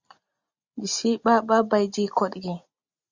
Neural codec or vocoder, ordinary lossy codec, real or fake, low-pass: none; Opus, 64 kbps; real; 7.2 kHz